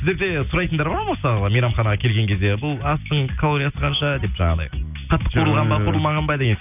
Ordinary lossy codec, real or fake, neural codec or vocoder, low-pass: none; real; none; 3.6 kHz